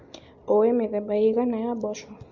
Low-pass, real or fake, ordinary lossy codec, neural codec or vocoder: 7.2 kHz; real; MP3, 48 kbps; none